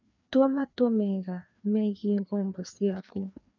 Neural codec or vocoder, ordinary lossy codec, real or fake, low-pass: codec, 16 kHz, 2 kbps, FreqCodec, larger model; none; fake; 7.2 kHz